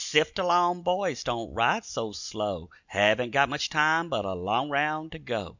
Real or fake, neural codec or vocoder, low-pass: real; none; 7.2 kHz